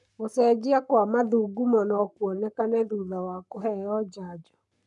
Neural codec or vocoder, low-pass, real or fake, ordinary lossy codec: codec, 44.1 kHz, 7.8 kbps, Pupu-Codec; 10.8 kHz; fake; none